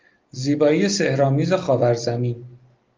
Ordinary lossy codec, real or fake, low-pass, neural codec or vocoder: Opus, 32 kbps; real; 7.2 kHz; none